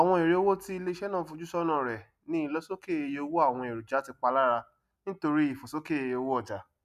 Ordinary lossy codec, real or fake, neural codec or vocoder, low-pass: none; real; none; 14.4 kHz